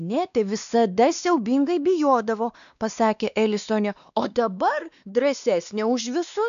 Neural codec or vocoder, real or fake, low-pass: codec, 16 kHz, 2 kbps, X-Codec, WavLM features, trained on Multilingual LibriSpeech; fake; 7.2 kHz